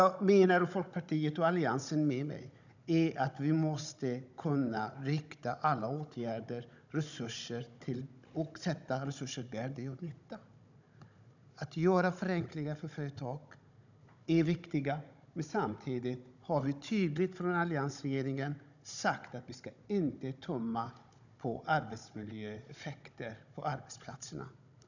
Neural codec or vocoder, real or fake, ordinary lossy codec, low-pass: codec, 16 kHz, 16 kbps, FunCodec, trained on Chinese and English, 50 frames a second; fake; none; 7.2 kHz